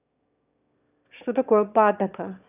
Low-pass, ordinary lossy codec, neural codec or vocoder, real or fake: 3.6 kHz; none; autoencoder, 22.05 kHz, a latent of 192 numbers a frame, VITS, trained on one speaker; fake